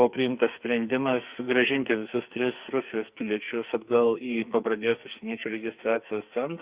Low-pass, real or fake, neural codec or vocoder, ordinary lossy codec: 3.6 kHz; fake; codec, 44.1 kHz, 2.6 kbps, SNAC; Opus, 64 kbps